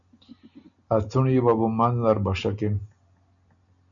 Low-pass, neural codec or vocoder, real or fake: 7.2 kHz; none; real